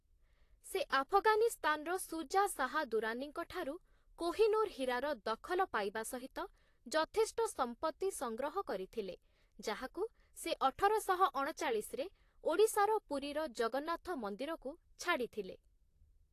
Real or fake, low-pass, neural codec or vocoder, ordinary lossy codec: fake; 14.4 kHz; vocoder, 44.1 kHz, 128 mel bands, Pupu-Vocoder; AAC, 48 kbps